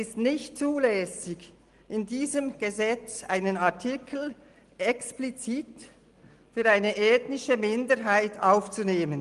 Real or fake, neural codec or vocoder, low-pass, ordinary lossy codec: real; none; 10.8 kHz; Opus, 24 kbps